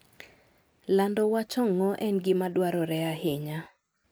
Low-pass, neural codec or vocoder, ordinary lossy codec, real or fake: none; none; none; real